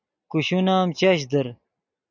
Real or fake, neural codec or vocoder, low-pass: real; none; 7.2 kHz